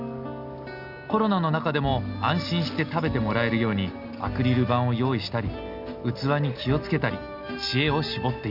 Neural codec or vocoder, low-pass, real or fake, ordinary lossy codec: none; 5.4 kHz; real; none